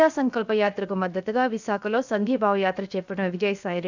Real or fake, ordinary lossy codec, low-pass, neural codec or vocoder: fake; none; 7.2 kHz; codec, 16 kHz, 0.7 kbps, FocalCodec